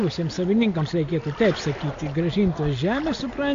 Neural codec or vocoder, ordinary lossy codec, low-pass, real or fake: none; AAC, 48 kbps; 7.2 kHz; real